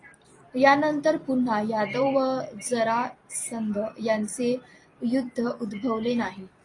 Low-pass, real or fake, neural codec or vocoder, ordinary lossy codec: 10.8 kHz; fake; vocoder, 44.1 kHz, 128 mel bands every 256 samples, BigVGAN v2; AAC, 32 kbps